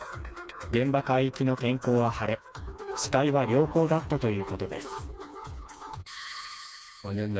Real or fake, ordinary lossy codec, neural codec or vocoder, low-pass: fake; none; codec, 16 kHz, 2 kbps, FreqCodec, smaller model; none